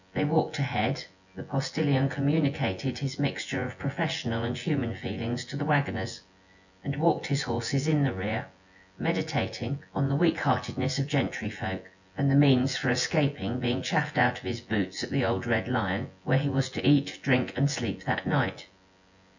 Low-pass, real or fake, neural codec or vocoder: 7.2 kHz; fake; vocoder, 24 kHz, 100 mel bands, Vocos